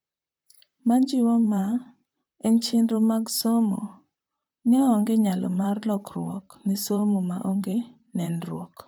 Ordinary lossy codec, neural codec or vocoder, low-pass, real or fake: none; vocoder, 44.1 kHz, 128 mel bands, Pupu-Vocoder; none; fake